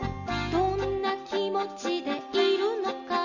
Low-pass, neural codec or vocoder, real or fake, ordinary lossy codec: 7.2 kHz; none; real; none